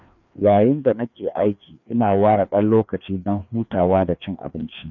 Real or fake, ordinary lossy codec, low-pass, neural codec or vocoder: fake; none; 7.2 kHz; codec, 16 kHz, 2 kbps, FreqCodec, larger model